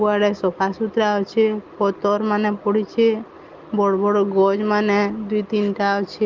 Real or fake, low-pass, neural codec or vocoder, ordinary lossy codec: real; 7.2 kHz; none; Opus, 32 kbps